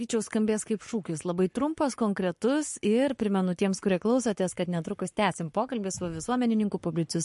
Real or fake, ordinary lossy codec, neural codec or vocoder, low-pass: fake; MP3, 48 kbps; codec, 44.1 kHz, 7.8 kbps, Pupu-Codec; 14.4 kHz